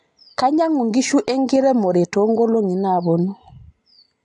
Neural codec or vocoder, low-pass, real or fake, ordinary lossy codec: none; 10.8 kHz; real; AAC, 64 kbps